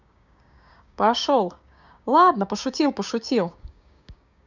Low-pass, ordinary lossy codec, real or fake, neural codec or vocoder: 7.2 kHz; none; fake; codec, 16 kHz, 6 kbps, DAC